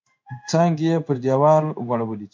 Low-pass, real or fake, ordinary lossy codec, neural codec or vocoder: 7.2 kHz; fake; MP3, 64 kbps; codec, 16 kHz in and 24 kHz out, 1 kbps, XY-Tokenizer